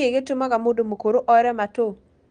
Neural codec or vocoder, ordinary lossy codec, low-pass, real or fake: none; Opus, 24 kbps; 9.9 kHz; real